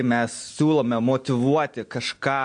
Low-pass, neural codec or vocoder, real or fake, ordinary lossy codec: 9.9 kHz; none; real; MP3, 48 kbps